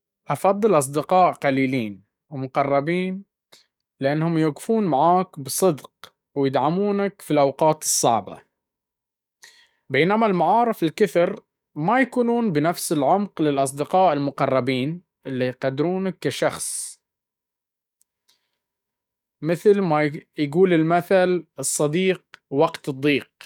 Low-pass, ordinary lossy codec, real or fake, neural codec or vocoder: 19.8 kHz; none; fake; codec, 44.1 kHz, 7.8 kbps, DAC